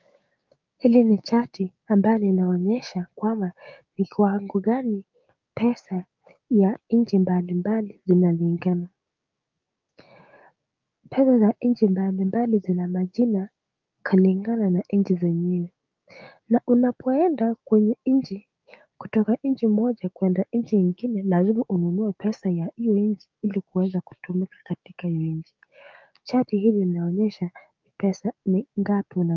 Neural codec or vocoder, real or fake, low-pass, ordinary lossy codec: codec, 16 kHz, 6 kbps, DAC; fake; 7.2 kHz; Opus, 24 kbps